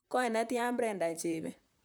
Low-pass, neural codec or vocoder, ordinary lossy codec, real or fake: none; vocoder, 44.1 kHz, 128 mel bands, Pupu-Vocoder; none; fake